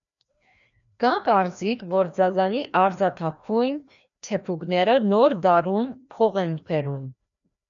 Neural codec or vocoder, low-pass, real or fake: codec, 16 kHz, 1 kbps, FreqCodec, larger model; 7.2 kHz; fake